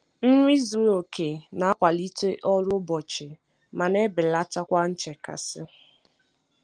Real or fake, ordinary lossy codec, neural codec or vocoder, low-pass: real; Opus, 24 kbps; none; 9.9 kHz